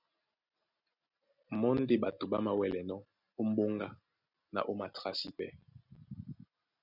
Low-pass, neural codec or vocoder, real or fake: 5.4 kHz; none; real